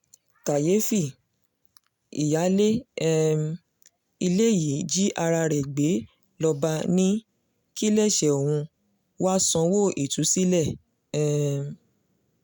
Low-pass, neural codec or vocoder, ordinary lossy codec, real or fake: 19.8 kHz; none; none; real